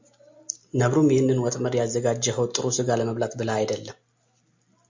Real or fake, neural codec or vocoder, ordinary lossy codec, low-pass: real; none; MP3, 64 kbps; 7.2 kHz